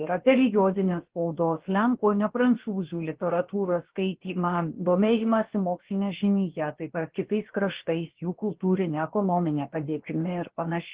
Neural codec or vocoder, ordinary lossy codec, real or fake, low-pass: codec, 16 kHz, about 1 kbps, DyCAST, with the encoder's durations; Opus, 16 kbps; fake; 3.6 kHz